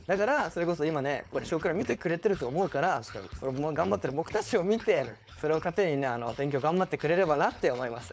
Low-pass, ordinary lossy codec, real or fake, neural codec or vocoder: none; none; fake; codec, 16 kHz, 4.8 kbps, FACodec